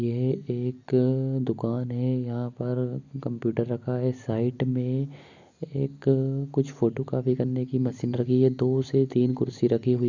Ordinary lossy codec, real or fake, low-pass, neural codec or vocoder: none; real; 7.2 kHz; none